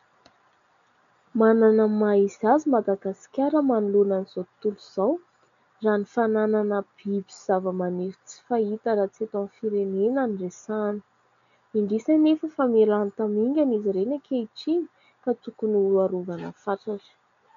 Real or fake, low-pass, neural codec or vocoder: real; 7.2 kHz; none